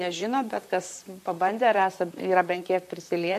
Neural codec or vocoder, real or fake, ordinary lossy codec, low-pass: vocoder, 44.1 kHz, 128 mel bands, Pupu-Vocoder; fake; MP3, 64 kbps; 14.4 kHz